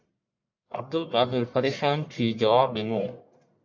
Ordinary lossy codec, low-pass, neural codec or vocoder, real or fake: MP3, 64 kbps; 7.2 kHz; codec, 44.1 kHz, 1.7 kbps, Pupu-Codec; fake